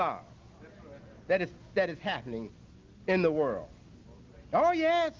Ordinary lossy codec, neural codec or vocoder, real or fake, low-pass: Opus, 24 kbps; none; real; 7.2 kHz